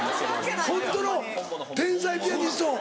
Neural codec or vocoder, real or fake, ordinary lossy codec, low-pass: none; real; none; none